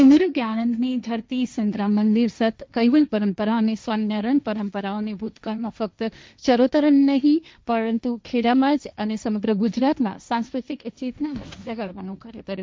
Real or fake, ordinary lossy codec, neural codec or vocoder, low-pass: fake; none; codec, 16 kHz, 1.1 kbps, Voila-Tokenizer; none